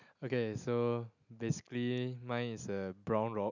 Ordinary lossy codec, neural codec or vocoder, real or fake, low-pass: none; none; real; 7.2 kHz